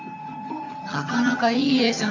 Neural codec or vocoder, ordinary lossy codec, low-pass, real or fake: vocoder, 22.05 kHz, 80 mel bands, HiFi-GAN; AAC, 48 kbps; 7.2 kHz; fake